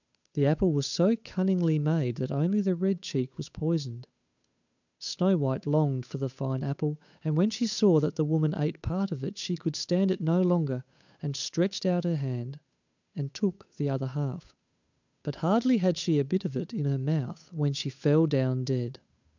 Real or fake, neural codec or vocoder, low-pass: fake; codec, 16 kHz, 8 kbps, FunCodec, trained on Chinese and English, 25 frames a second; 7.2 kHz